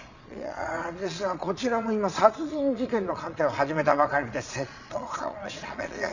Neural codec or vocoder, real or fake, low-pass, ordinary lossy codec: vocoder, 22.05 kHz, 80 mel bands, Vocos; fake; 7.2 kHz; none